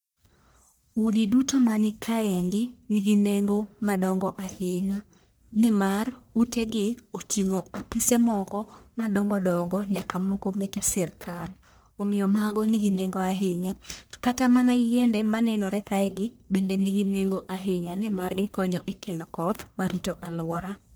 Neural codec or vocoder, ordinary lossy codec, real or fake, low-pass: codec, 44.1 kHz, 1.7 kbps, Pupu-Codec; none; fake; none